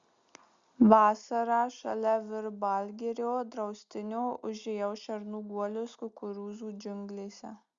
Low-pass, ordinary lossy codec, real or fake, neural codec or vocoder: 7.2 kHz; Opus, 64 kbps; real; none